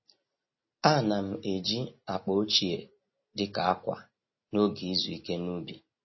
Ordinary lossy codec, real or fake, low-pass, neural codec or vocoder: MP3, 24 kbps; real; 7.2 kHz; none